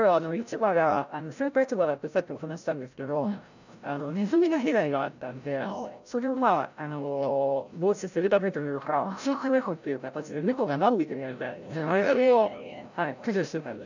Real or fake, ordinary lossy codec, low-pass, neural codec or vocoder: fake; none; 7.2 kHz; codec, 16 kHz, 0.5 kbps, FreqCodec, larger model